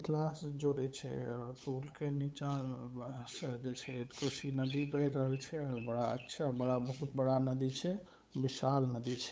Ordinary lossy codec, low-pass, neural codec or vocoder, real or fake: none; none; codec, 16 kHz, 8 kbps, FunCodec, trained on LibriTTS, 25 frames a second; fake